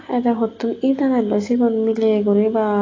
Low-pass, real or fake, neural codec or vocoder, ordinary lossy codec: 7.2 kHz; real; none; AAC, 32 kbps